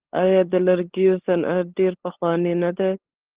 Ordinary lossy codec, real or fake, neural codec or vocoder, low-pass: Opus, 16 kbps; fake; codec, 16 kHz, 8 kbps, FunCodec, trained on LibriTTS, 25 frames a second; 3.6 kHz